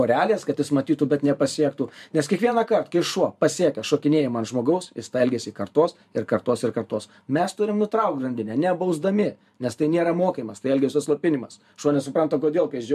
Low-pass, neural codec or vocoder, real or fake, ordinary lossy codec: 14.4 kHz; vocoder, 44.1 kHz, 128 mel bands every 512 samples, BigVGAN v2; fake; MP3, 96 kbps